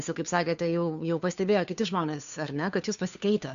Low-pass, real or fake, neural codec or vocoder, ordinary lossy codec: 7.2 kHz; fake; codec, 16 kHz, 2 kbps, FunCodec, trained on Chinese and English, 25 frames a second; AAC, 96 kbps